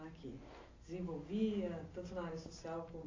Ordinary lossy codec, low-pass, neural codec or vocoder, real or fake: AAC, 32 kbps; 7.2 kHz; none; real